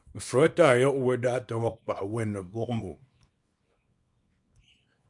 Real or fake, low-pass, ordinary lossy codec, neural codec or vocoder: fake; 10.8 kHz; MP3, 96 kbps; codec, 24 kHz, 0.9 kbps, WavTokenizer, small release